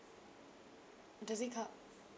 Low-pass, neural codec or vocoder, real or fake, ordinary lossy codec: none; none; real; none